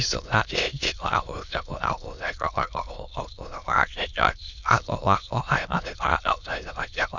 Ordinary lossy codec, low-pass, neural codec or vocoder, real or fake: none; 7.2 kHz; autoencoder, 22.05 kHz, a latent of 192 numbers a frame, VITS, trained on many speakers; fake